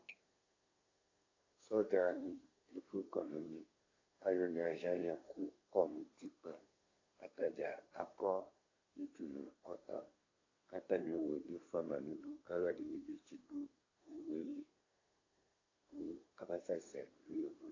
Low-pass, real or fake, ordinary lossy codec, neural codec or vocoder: 7.2 kHz; fake; AAC, 32 kbps; codec, 24 kHz, 1 kbps, SNAC